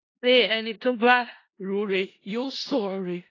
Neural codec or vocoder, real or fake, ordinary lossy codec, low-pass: codec, 16 kHz in and 24 kHz out, 0.4 kbps, LongCat-Audio-Codec, four codebook decoder; fake; AAC, 32 kbps; 7.2 kHz